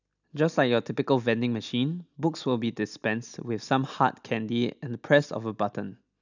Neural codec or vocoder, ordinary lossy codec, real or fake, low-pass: none; none; real; 7.2 kHz